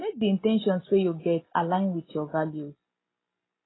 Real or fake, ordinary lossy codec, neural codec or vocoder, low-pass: real; AAC, 16 kbps; none; 7.2 kHz